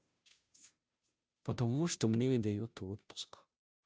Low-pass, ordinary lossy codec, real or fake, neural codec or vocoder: none; none; fake; codec, 16 kHz, 0.5 kbps, FunCodec, trained on Chinese and English, 25 frames a second